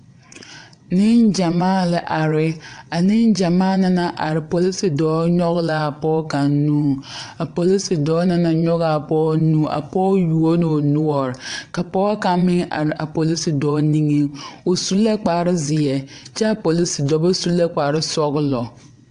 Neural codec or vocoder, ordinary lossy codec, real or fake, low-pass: vocoder, 22.05 kHz, 80 mel bands, WaveNeXt; AAC, 64 kbps; fake; 9.9 kHz